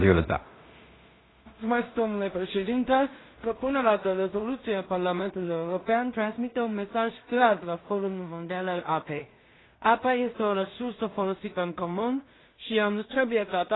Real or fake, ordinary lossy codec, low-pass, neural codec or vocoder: fake; AAC, 16 kbps; 7.2 kHz; codec, 16 kHz in and 24 kHz out, 0.4 kbps, LongCat-Audio-Codec, two codebook decoder